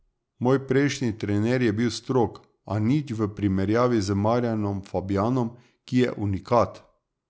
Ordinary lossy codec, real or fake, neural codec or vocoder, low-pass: none; real; none; none